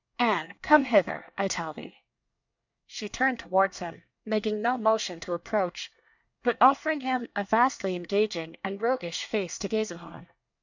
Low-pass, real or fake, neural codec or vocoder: 7.2 kHz; fake; codec, 24 kHz, 1 kbps, SNAC